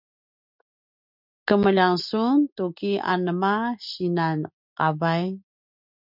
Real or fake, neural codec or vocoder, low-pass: real; none; 5.4 kHz